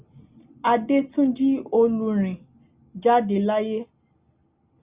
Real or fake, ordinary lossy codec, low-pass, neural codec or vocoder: real; Opus, 64 kbps; 3.6 kHz; none